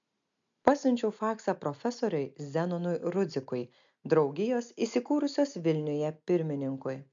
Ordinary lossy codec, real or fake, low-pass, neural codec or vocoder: MP3, 64 kbps; real; 7.2 kHz; none